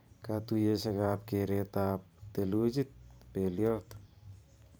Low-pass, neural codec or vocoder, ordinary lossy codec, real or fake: none; vocoder, 44.1 kHz, 128 mel bands every 512 samples, BigVGAN v2; none; fake